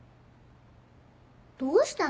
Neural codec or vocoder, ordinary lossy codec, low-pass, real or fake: none; none; none; real